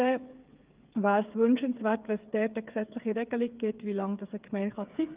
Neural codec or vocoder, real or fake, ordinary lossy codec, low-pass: codec, 16 kHz, 8 kbps, FreqCodec, smaller model; fake; Opus, 32 kbps; 3.6 kHz